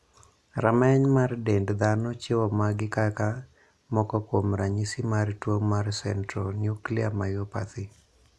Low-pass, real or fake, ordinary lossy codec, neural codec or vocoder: none; real; none; none